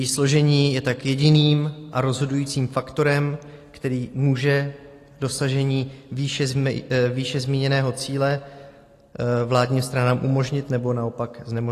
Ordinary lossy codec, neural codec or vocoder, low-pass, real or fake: AAC, 48 kbps; none; 14.4 kHz; real